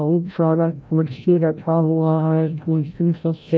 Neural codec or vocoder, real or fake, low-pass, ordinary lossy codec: codec, 16 kHz, 0.5 kbps, FreqCodec, larger model; fake; none; none